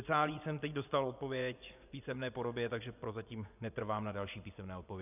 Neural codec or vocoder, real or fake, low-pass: vocoder, 24 kHz, 100 mel bands, Vocos; fake; 3.6 kHz